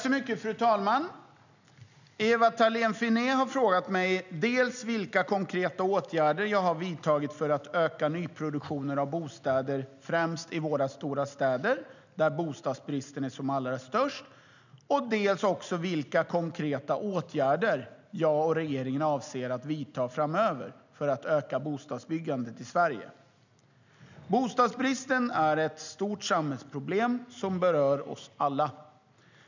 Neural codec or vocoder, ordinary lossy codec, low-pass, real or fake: none; none; 7.2 kHz; real